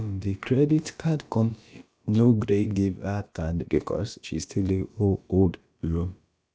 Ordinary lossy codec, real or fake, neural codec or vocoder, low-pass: none; fake; codec, 16 kHz, about 1 kbps, DyCAST, with the encoder's durations; none